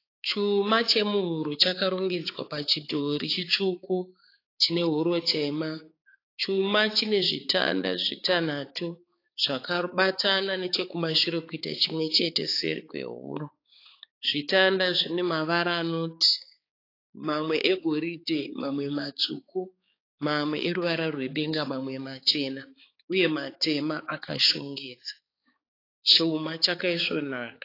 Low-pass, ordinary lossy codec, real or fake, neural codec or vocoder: 5.4 kHz; AAC, 32 kbps; fake; codec, 16 kHz, 4 kbps, X-Codec, HuBERT features, trained on balanced general audio